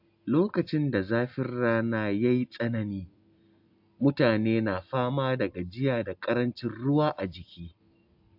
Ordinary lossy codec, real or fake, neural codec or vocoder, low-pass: AAC, 48 kbps; real; none; 5.4 kHz